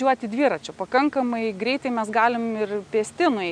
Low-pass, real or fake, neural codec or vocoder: 9.9 kHz; real; none